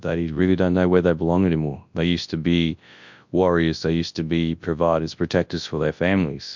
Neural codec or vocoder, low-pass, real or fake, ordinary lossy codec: codec, 24 kHz, 0.9 kbps, WavTokenizer, large speech release; 7.2 kHz; fake; MP3, 64 kbps